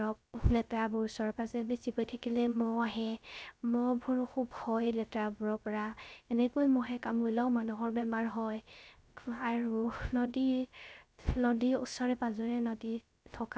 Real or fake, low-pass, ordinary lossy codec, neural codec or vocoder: fake; none; none; codec, 16 kHz, 0.3 kbps, FocalCodec